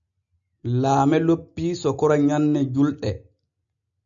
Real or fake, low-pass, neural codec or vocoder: real; 7.2 kHz; none